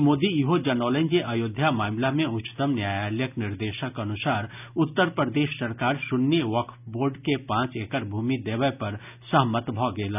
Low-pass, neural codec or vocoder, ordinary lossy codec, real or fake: 3.6 kHz; none; none; real